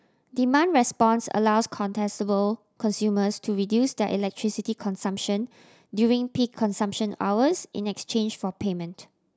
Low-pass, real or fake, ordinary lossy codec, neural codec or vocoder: none; real; none; none